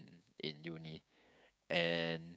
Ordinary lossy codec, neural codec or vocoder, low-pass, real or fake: none; codec, 16 kHz, 6 kbps, DAC; none; fake